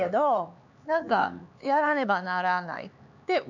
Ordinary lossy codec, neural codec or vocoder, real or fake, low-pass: none; codec, 16 kHz, 4 kbps, X-Codec, HuBERT features, trained on LibriSpeech; fake; 7.2 kHz